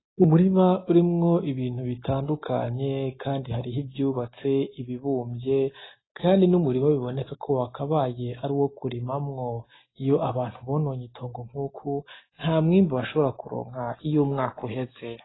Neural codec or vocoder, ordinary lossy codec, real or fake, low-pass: none; AAC, 16 kbps; real; 7.2 kHz